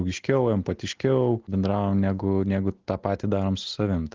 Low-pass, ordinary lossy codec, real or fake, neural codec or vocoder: 7.2 kHz; Opus, 16 kbps; real; none